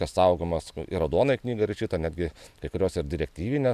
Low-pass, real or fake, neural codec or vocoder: 14.4 kHz; real; none